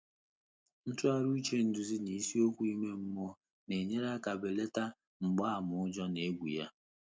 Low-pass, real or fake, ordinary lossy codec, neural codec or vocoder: none; real; none; none